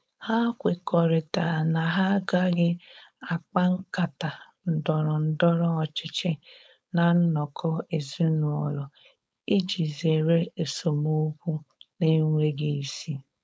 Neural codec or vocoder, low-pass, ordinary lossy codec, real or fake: codec, 16 kHz, 4.8 kbps, FACodec; none; none; fake